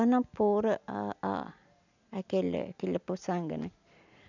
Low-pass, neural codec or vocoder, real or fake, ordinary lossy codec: 7.2 kHz; none; real; none